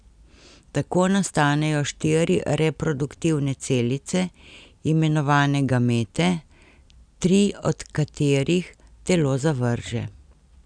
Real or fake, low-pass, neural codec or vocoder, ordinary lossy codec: real; 9.9 kHz; none; none